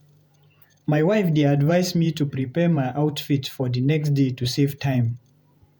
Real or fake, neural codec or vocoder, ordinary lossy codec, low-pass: fake; vocoder, 48 kHz, 128 mel bands, Vocos; none; none